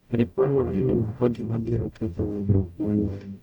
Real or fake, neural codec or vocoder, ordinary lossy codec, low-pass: fake; codec, 44.1 kHz, 0.9 kbps, DAC; none; 19.8 kHz